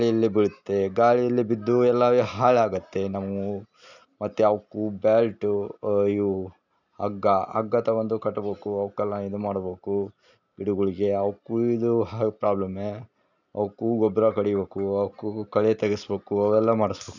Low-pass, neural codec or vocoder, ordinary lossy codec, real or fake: 7.2 kHz; none; none; real